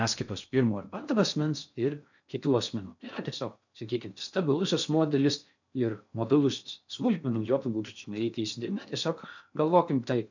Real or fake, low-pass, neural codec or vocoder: fake; 7.2 kHz; codec, 16 kHz in and 24 kHz out, 0.6 kbps, FocalCodec, streaming, 4096 codes